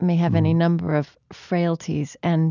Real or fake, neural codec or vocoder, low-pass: real; none; 7.2 kHz